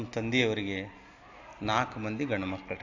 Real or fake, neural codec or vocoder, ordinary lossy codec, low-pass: real; none; AAC, 48 kbps; 7.2 kHz